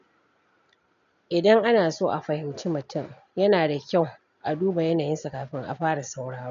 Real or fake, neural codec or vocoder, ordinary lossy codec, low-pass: real; none; AAC, 96 kbps; 7.2 kHz